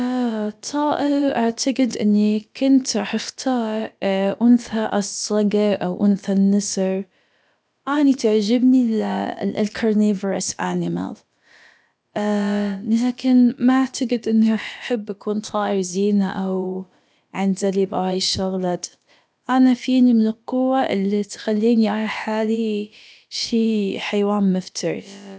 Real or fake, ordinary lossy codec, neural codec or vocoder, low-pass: fake; none; codec, 16 kHz, about 1 kbps, DyCAST, with the encoder's durations; none